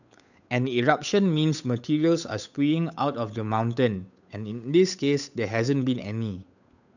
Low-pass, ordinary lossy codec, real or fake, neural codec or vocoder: 7.2 kHz; none; fake; codec, 16 kHz, 8 kbps, FunCodec, trained on Chinese and English, 25 frames a second